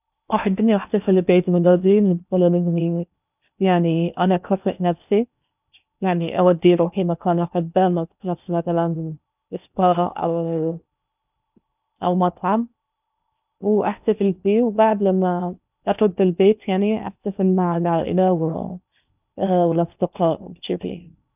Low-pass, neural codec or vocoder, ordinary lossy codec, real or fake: 3.6 kHz; codec, 16 kHz in and 24 kHz out, 0.8 kbps, FocalCodec, streaming, 65536 codes; none; fake